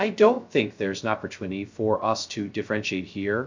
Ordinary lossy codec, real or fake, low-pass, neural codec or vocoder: MP3, 64 kbps; fake; 7.2 kHz; codec, 16 kHz, 0.2 kbps, FocalCodec